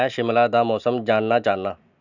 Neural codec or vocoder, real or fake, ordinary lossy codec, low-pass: none; real; none; 7.2 kHz